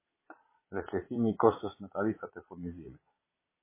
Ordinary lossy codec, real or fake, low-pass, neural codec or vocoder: MP3, 16 kbps; fake; 3.6 kHz; vocoder, 22.05 kHz, 80 mel bands, Vocos